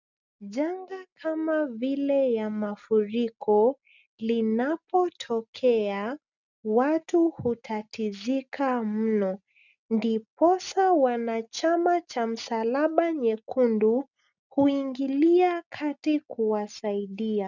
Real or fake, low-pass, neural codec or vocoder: real; 7.2 kHz; none